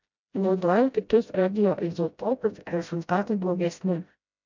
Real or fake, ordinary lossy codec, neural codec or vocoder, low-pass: fake; MP3, 64 kbps; codec, 16 kHz, 0.5 kbps, FreqCodec, smaller model; 7.2 kHz